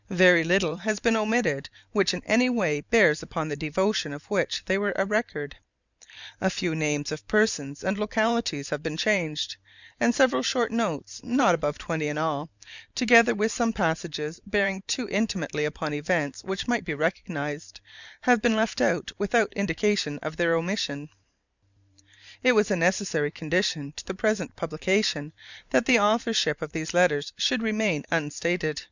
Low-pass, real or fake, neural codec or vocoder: 7.2 kHz; fake; vocoder, 44.1 kHz, 128 mel bands every 512 samples, BigVGAN v2